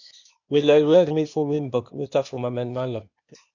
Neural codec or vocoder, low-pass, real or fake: codec, 16 kHz, 0.8 kbps, ZipCodec; 7.2 kHz; fake